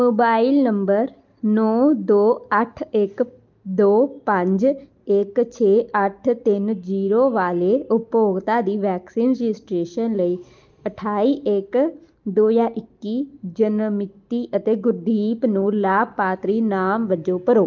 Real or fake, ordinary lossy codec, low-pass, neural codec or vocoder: real; Opus, 24 kbps; 7.2 kHz; none